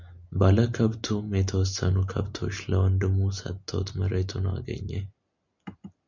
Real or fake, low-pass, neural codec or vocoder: real; 7.2 kHz; none